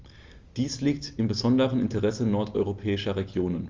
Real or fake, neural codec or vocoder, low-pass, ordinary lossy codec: real; none; 7.2 kHz; Opus, 32 kbps